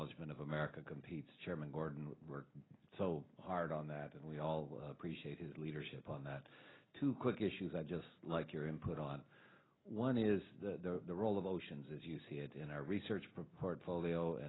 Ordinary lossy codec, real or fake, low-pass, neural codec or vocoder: AAC, 16 kbps; real; 7.2 kHz; none